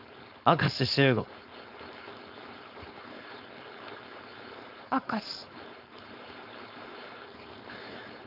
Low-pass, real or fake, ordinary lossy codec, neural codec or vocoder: 5.4 kHz; fake; none; codec, 16 kHz, 4.8 kbps, FACodec